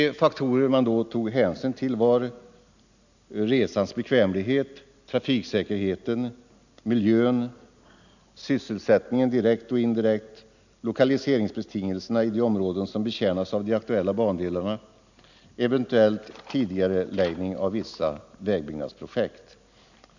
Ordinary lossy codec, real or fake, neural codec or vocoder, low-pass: none; real; none; 7.2 kHz